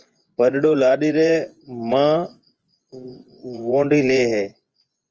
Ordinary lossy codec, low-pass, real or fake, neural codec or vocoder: Opus, 24 kbps; 7.2 kHz; fake; vocoder, 24 kHz, 100 mel bands, Vocos